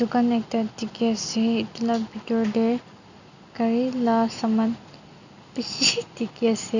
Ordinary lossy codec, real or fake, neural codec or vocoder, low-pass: none; real; none; 7.2 kHz